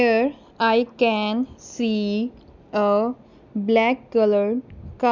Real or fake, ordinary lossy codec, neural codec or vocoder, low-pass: real; none; none; 7.2 kHz